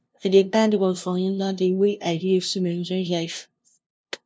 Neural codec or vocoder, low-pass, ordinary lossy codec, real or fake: codec, 16 kHz, 0.5 kbps, FunCodec, trained on LibriTTS, 25 frames a second; none; none; fake